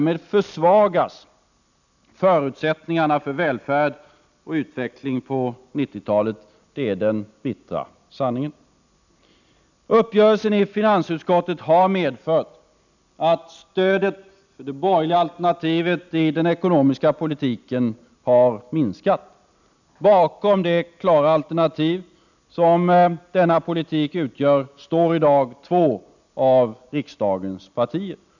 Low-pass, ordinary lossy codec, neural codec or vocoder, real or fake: 7.2 kHz; none; none; real